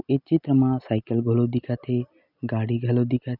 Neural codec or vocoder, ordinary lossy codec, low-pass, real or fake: none; none; 5.4 kHz; real